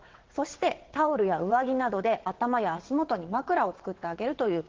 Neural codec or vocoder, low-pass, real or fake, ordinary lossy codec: vocoder, 22.05 kHz, 80 mel bands, WaveNeXt; 7.2 kHz; fake; Opus, 16 kbps